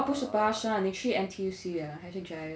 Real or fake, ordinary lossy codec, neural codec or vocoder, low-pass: real; none; none; none